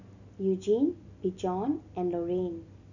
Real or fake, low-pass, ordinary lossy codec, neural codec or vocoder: real; 7.2 kHz; none; none